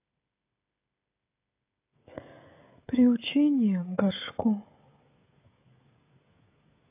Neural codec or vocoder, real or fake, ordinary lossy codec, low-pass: codec, 16 kHz, 16 kbps, FreqCodec, smaller model; fake; AAC, 24 kbps; 3.6 kHz